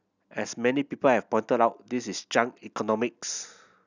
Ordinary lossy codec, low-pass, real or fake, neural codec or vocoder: none; 7.2 kHz; real; none